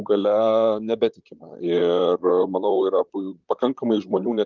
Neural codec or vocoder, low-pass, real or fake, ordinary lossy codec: vocoder, 44.1 kHz, 128 mel bands, Pupu-Vocoder; 7.2 kHz; fake; Opus, 24 kbps